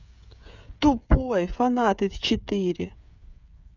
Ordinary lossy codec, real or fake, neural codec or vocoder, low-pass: none; fake; codec, 16 kHz, 8 kbps, FreqCodec, smaller model; 7.2 kHz